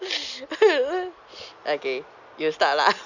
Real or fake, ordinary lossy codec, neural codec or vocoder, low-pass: real; none; none; 7.2 kHz